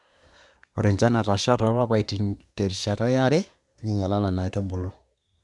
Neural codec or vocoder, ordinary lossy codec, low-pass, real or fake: codec, 24 kHz, 1 kbps, SNAC; none; 10.8 kHz; fake